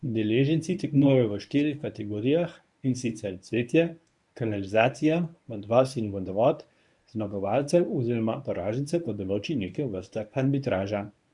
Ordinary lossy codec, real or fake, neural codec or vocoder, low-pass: none; fake; codec, 24 kHz, 0.9 kbps, WavTokenizer, medium speech release version 1; 10.8 kHz